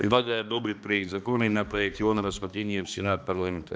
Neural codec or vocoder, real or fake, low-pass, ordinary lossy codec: codec, 16 kHz, 2 kbps, X-Codec, HuBERT features, trained on balanced general audio; fake; none; none